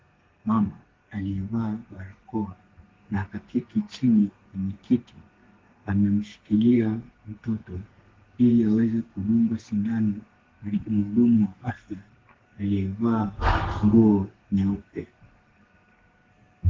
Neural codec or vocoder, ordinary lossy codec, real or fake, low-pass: codec, 32 kHz, 1.9 kbps, SNAC; Opus, 16 kbps; fake; 7.2 kHz